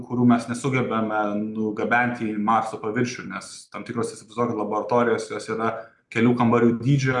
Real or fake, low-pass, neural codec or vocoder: real; 10.8 kHz; none